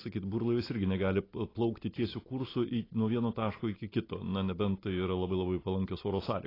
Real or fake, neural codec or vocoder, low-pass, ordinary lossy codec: real; none; 5.4 kHz; AAC, 24 kbps